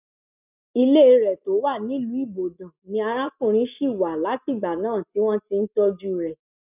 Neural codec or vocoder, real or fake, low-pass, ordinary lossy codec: none; real; 3.6 kHz; none